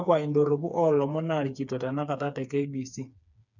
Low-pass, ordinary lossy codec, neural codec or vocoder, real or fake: 7.2 kHz; none; codec, 16 kHz, 4 kbps, FreqCodec, smaller model; fake